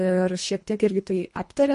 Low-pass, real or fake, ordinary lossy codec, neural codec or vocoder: 10.8 kHz; fake; MP3, 48 kbps; codec, 24 kHz, 1.5 kbps, HILCodec